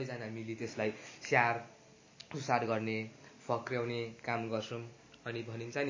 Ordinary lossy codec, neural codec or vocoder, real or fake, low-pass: MP3, 32 kbps; none; real; 7.2 kHz